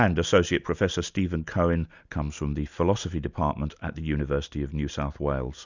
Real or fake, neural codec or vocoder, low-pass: real; none; 7.2 kHz